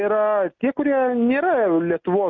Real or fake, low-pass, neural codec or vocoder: real; 7.2 kHz; none